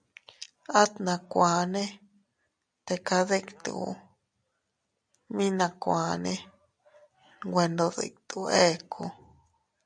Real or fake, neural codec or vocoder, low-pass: real; none; 9.9 kHz